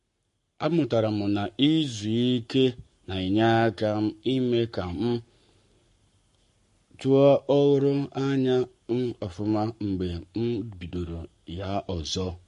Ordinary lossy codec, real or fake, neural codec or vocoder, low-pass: MP3, 48 kbps; fake; codec, 44.1 kHz, 7.8 kbps, Pupu-Codec; 14.4 kHz